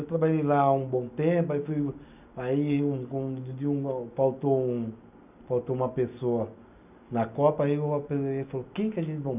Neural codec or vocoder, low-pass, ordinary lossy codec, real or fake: none; 3.6 kHz; none; real